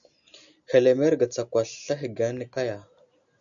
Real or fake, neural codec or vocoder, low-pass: real; none; 7.2 kHz